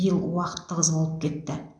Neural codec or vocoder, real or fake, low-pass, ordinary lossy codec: none; real; 9.9 kHz; MP3, 96 kbps